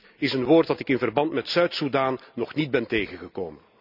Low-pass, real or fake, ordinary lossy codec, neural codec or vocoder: 5.4 kHz; real; none; none